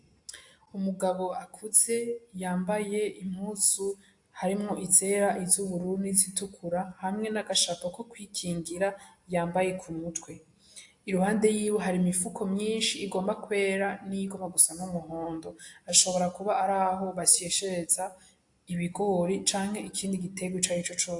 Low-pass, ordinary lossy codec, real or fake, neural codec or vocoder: 10.8 kHz; AAC, 64 kbps; real; none